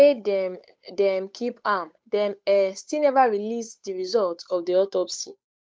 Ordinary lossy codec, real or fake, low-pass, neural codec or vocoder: none; fake; none; codec, 16 kHz, 8 kbps, FunCodec, trained on Chinese and English, 25 frames a second